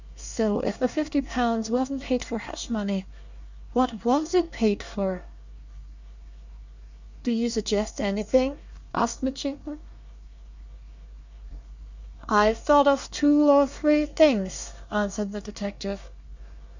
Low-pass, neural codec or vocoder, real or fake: 7.2 kHz; codec, 24 kHz, 1 kbps, SNAC; fake